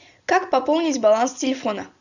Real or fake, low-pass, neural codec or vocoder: real; 7.2 kHz; none